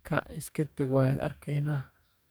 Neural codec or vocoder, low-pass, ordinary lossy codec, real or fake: codec, 44.1 kHz, 2.6 kbps, DAC; none; none; fake